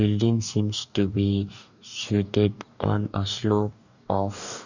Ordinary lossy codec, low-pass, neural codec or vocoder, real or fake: none; 7.2 kHz; codec, 44.1 kHz, 2.6 kbps, DAC; fake